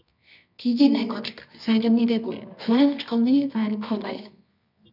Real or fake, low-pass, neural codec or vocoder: fake; 5.4 kHz; codec, 24 kHz, 0.9 kbps, WavTokenizer, medium music audio release